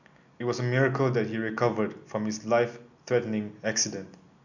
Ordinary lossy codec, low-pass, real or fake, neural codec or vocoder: none; 7.2 kHz; real; none